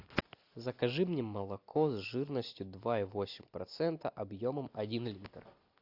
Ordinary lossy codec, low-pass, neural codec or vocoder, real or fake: AAC, 48 kbps; 5.4 kHz; none; real